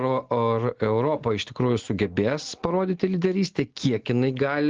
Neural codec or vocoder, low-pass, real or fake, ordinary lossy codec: none; 7.2 kHz; real; Opus, 16 kbps